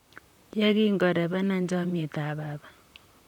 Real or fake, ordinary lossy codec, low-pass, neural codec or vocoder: fake; none; 19.8 kHz; vocoder, 44.1 kHz, 128 mel bands, Pupu-Vocoder